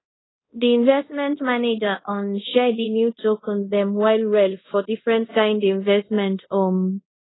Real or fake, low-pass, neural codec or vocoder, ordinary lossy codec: fake; 7.2 kHz; codec, 24 kHz, 0.5 kbps, DualCodec; AAC, 16 kbps